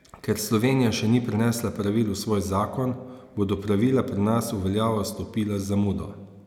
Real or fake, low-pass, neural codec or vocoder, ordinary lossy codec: real; 19.8 kHz; none; none